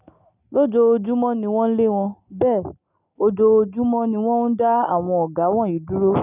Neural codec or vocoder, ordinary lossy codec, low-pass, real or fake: none; none; 3.6 kHz; real